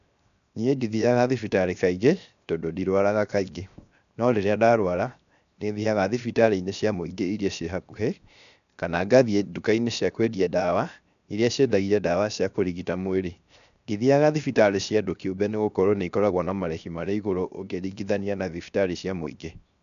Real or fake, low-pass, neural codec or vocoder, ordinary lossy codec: fake; 7.2 kHz; codec, 16 kHz, 0.7 kbps, FocalCodec; none